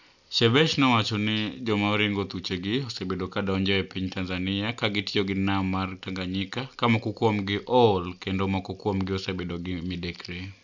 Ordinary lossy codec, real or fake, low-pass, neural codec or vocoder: none; real; 7.2 kHz; none